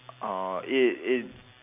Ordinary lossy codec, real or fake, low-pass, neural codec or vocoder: none; real; 3.6 kHz; none